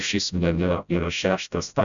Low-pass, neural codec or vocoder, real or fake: 7.2 kHz; codec, 16 kHz, 0.5 kbps, FreqCodec, smaller model; fake